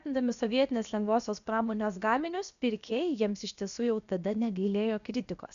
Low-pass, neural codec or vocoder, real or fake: 7.2 kHz; codec, 16 kHz, about 1 kbps, DyCAST, with the encoder's durations; fake